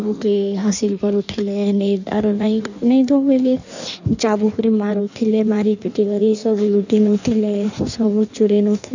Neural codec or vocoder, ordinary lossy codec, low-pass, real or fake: codec, 16 kHz in and 24 kHz out, 1.1 kbps, FireRedTTS-2 codec; none; 7.2 kHz; fake